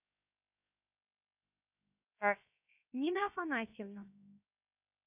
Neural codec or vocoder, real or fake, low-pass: codec, 16 kHz, 0.7 kbps, FocalCodec; fake; 3.6 kHz